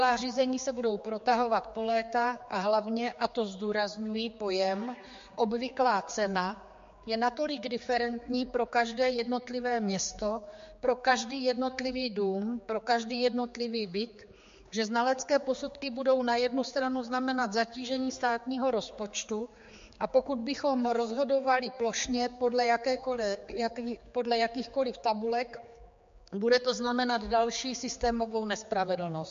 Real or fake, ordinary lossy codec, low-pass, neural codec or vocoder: fake; MP3, 48 kbps; 7.2 kHz; codec, 16 kHz, 4 kbps, X-Codec, HuBERT features, trained on general audio